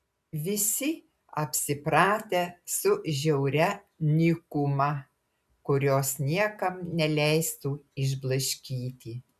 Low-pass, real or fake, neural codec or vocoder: 14.4 kHz; real; none